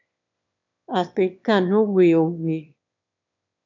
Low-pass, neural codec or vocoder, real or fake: 7.2 kHz; autoencoder, 22.05 kHz, a latent of 192 numbers a frame, VITS, trained on one speaker; fake